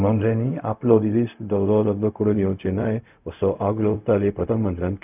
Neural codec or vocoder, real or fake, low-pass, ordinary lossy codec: codec, 16 kHz, 0.4 kbps, LongCat-Audio-Codec; fake; 3.6 kHz; none